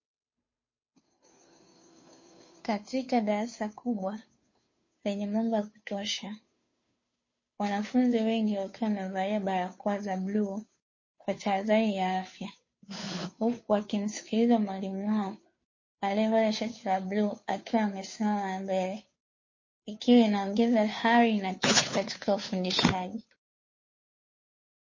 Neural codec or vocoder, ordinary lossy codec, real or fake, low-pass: codec, 16 kHz, 2 kbps, FunCodec, trained on Chinese and English, 25 frames a second; MP3, 32 kbps; fake; 7.2 kHz